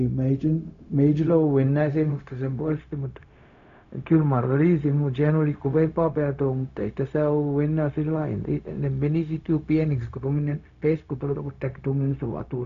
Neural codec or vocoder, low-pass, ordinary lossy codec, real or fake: codec, 16 kHz, 0.4 kbps, LongCat-Audio-Codec; 7.2 kHz; none; fake